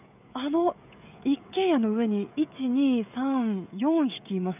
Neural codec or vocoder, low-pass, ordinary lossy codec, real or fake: codec, 16 kHz, 16 kbps, FreqCodec, smaller model; 3.6 kHz; none; fake